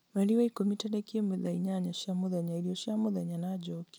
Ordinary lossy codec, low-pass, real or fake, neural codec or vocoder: none; none; real; none